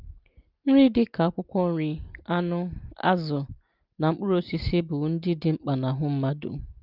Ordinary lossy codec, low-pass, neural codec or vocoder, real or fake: Opus, 32 kbps; 5.4 kHz; none; real